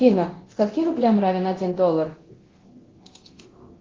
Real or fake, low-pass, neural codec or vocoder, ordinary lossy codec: fake; 7.2 kHz; codec, 24 kHz, 0.9 kbps, DualCodec; Opus, 16 kbps